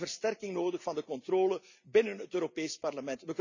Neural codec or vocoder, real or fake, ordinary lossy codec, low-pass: none; real; none; 7.2 kHz